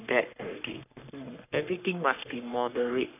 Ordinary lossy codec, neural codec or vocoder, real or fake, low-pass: none; codec, 44.1 kHz, 3.4 kbps, Pupu-Codec; fake; 3.6 kHz